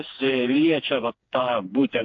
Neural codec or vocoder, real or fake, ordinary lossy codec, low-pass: codec, 16 kHz, 2 kbps, FreqCodec, smaller model; fake; AAC, 48 kbps; 7.2 kHz